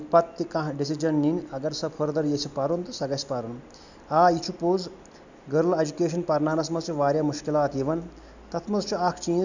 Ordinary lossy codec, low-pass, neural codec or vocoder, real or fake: none; 7.2 kHz; none; real